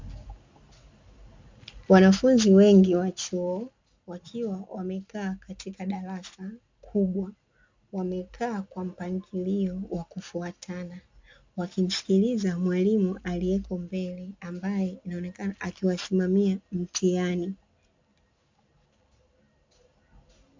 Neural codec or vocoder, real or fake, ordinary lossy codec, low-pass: none; real; MP3, 64 kbps; 7.2 kHz